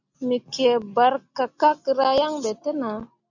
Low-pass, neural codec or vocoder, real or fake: 7.2 kHz; none; real